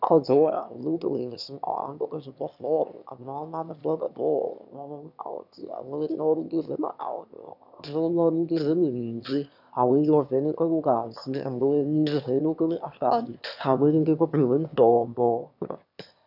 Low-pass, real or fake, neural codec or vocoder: 5.4 kHz; fake; autoencoder, 22.05 kHz, a latent of 192 numbers a frame, VITS, trained on one speaker